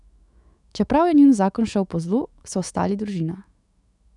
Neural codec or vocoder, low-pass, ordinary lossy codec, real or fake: autoencoder, 48 kHz, 128 numbers a frame, DAC-VAE, trained on Japanese speech; 10.8 kHz; none; fake